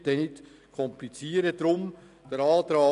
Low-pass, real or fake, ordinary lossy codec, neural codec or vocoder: 10.8 kHz; real; none; none